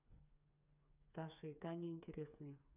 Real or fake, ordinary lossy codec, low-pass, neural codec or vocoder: fake; none; 3.6 kHz; codec, 16 kHz, 4 kbps, FreqCodec, smaller model